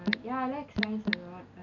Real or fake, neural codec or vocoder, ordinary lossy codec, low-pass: real; none; none; 7.2 kHz